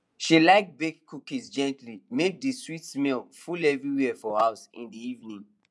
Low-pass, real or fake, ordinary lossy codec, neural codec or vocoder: none; fake; none; vocoder, 24 kHz, 100 mel bands, Vocos